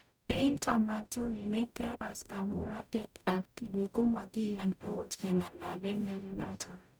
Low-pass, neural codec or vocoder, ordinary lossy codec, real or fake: none; codec, 44.1 kHz, 0.9 kbps, DAC; none; fake